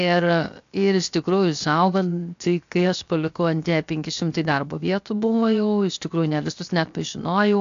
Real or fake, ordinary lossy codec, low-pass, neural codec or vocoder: fake; AAC, 64 kbps; 7.2 kHz; codec, 16 kHz, 0.7 kbps, FocalCodec